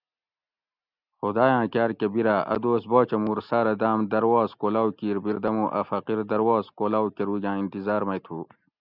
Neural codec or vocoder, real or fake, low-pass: none; real; 5.4 kHz